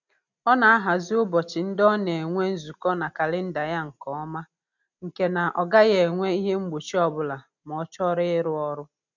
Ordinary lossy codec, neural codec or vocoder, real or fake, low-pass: none; none; real; 7.2 kHz